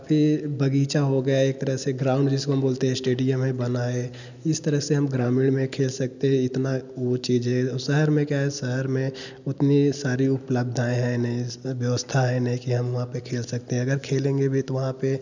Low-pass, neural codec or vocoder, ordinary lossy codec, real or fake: 7.2 kHz; none; none; real